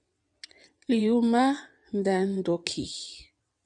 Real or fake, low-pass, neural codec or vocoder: fake; 9.9 kHz; vocoder, 22.05 kHz, 80 mel bands, WaveNeXt